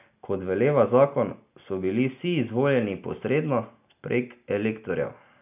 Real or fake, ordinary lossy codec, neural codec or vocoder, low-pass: real; none; none; 3.6 kHz